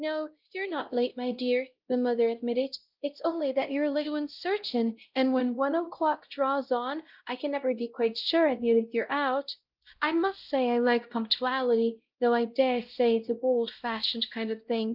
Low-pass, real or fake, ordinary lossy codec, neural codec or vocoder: 5.4 kHz; fake; Opus, 24 kbps; codec, 16 kHz, 0.5 kbps, X-Codec, WavLM features, trained on Multilingual LibriSpeech